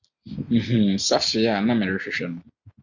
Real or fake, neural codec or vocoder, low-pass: real; none; 7.2 kHz